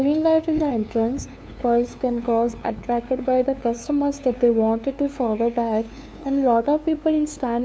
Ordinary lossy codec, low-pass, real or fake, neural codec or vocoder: none; none; fake; codec, 16 kHz, 2 kbps, FunCodec, trained on LibriTTS, 25 frames a second